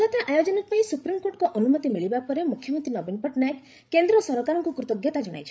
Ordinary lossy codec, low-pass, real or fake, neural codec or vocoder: none; none; fake; codec, 16 kHz, 16 kbps, FreqCodec, larger model